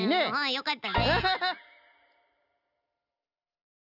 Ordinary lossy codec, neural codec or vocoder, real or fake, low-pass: none; none; real; 5.4 kHz